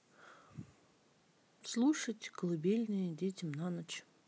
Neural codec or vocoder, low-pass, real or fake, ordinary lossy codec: none; none; real; none